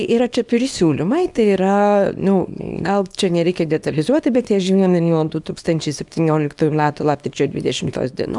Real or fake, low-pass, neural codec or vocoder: fake; 10.8 kHz; codec, 24 kHz, 0.9 kbps, WavTokenizer, small release